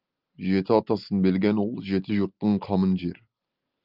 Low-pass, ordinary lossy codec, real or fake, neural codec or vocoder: 5.4 kHz; Opus, 24 kbps; real; none